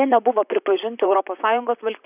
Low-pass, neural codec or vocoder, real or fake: 3.6 kHz; vocoder, 44.1 kHz, 128 mel bands, Pupu-Vocoder; fake